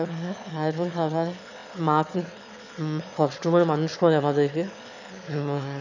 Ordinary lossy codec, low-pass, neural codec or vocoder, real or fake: none; 7.2 kHz; autoencoder, 22.05 kHz, a latent of 192 numbers a frame, VITS, trained on one speaker; fake